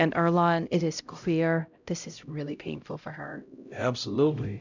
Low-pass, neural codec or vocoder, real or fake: 7.2 kHz; codec, 16 kHz, 0.5 kbps, X-Codec, HuBERT features, trained on LibriSpeech; fake